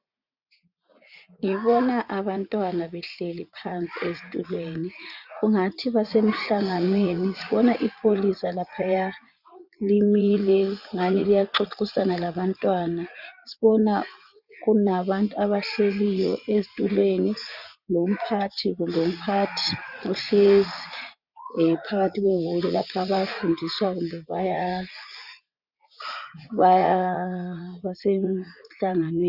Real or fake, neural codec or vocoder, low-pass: fake; vocoder, 44.1 kHz, 80 mel bands, Vocos; 5.4 kHz